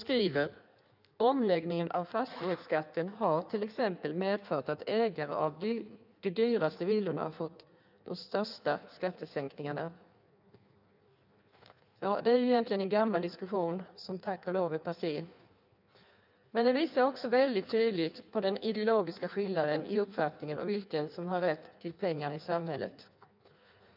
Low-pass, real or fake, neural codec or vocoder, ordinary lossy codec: 5.4 kHz; fake; codec, 16 kHz in and 24 kHz out, 1.1 kbps, FireRedTTS-2 codec; none